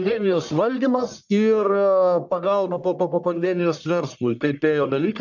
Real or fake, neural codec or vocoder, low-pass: fake; codec, 44.1 kHz, 1.7 kbps, Pupu-Codec; 7.2 kHz